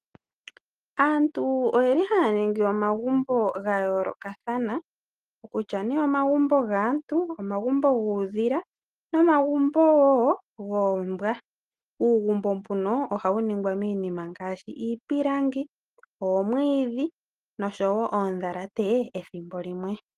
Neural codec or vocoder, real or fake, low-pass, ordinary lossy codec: none; real; 14.4 kHz; Opus, 24 kbps